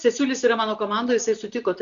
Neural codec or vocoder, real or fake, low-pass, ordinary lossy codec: none; real; 7.2 kHz; MP3, 96 kbps